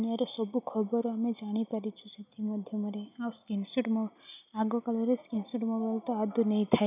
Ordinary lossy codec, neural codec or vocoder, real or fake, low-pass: none; none; real; 3.6 kHz